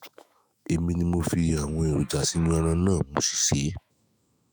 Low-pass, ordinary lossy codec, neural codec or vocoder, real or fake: none; none; autoencoder, 48 kHz, 128 numbers a frame, DAC-VAE, trained on Japanese speech; fake